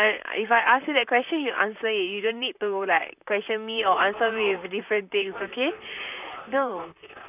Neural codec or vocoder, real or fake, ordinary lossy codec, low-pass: vocoder, 44.1 kHz, 128 mel bands, Pupu-Vocoder; fake; none; 3.6 kHz